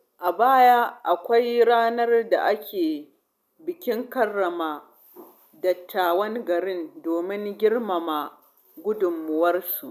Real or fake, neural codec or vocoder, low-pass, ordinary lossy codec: real; none; 14.4 kHz; none